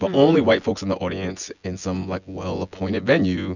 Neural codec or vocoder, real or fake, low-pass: vocoder, 24 kHz, 100 mel bands, Vocos; fake; 7.2 kHz